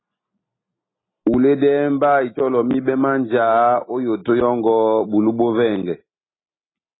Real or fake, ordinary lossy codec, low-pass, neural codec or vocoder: real; AAC, 16 kbps; 7.2 kHz; none